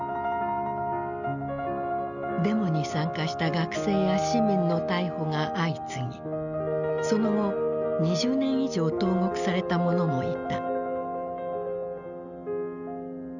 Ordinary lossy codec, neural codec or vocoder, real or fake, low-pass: none; none; real; 7.2 kHz